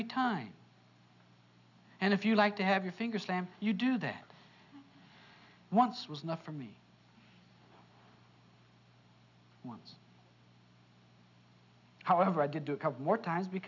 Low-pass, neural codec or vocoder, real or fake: 7.2 kHz; none; real